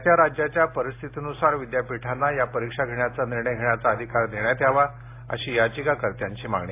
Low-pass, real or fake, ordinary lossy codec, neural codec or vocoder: 3.6 kHz; real; AAC, 24 kbps; none